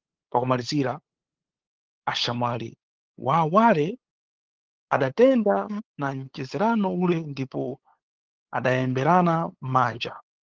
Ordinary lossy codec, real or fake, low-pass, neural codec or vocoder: Opus, 16 kbps; fake; 7.2 kHz; codec, 16 kHz, 8 kbps, FunCodec, trained on LibriTTS, 25 frames a second